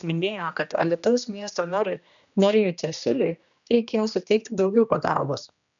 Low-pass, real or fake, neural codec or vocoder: 7.2 kHz; fake; codec, 16 kHz, 1 kbps, X-Codec, HuBERT features, trained on general audio